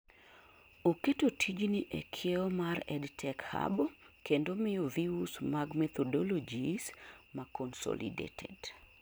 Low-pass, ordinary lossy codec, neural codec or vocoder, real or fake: none; none; none; real